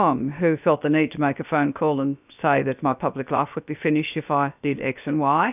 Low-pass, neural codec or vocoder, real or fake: 3.6 kHz; codec, 16 kHz, about 1 kbps, DyCAST, with the encoder's durations; fake